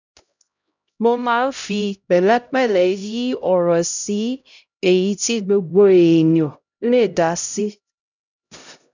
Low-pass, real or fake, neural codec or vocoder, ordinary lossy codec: 7.2 kHz; fake; codec, 16 kHz, 0.5 kbps, X-Codec, HuBERT features, trained on LibriSpeech; none